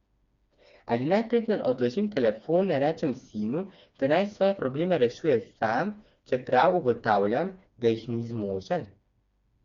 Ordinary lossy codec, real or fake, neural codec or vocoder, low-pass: Opus, 64 kbps; fake; codec, 16 kHz, 2 kbps, FreqCodec, smaller model; 7.2 kHz